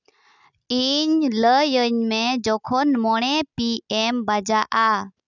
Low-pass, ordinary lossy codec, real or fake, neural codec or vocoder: 7.2 kHz; none; real; none